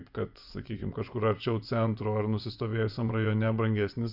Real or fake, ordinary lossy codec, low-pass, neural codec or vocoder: fake; Opus, 64 kbps; 5.4 kHz; vocoder, 24 kHz, 100 mel bands, Vocos